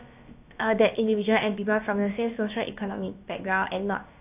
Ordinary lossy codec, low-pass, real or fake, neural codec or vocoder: AAC, 32 kbps; 3.6 kHz; fake; codec, 16 kHz, about 1 kbps, DyCAST, with the encoder's durations